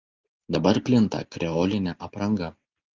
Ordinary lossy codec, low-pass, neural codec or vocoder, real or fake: Opus, 32 kbps; 7.2 kHz; none; real